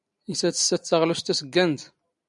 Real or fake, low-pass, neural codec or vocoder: real; 10.8 kHz; none